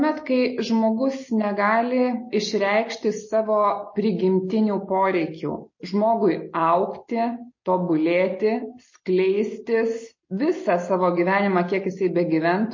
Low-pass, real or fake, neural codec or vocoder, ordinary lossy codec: 7.2 kHz; real; none; MP3, 32 kbps